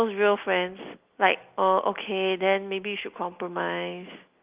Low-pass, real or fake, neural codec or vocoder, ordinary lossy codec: 3.6 kHz; real; none; Opus, 32 kbps